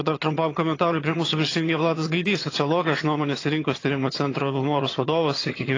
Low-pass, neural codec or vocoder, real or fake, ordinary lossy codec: 7.2 kHz; vocoder, 22.05 kHz, 80 mel bands, HiFi-GAN; fake; AAC, 32 kbps